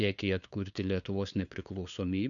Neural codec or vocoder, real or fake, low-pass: codec, 16 kHz, 2 kbps, FunCodec, trained on LibriTTS, 25 frames a second; fake; 7.2 kHz